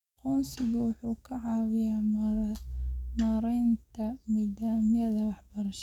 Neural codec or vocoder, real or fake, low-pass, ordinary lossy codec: codec, 44.1 kHz, 7.8 kbps, DAC; fake; 19.8 kHz; none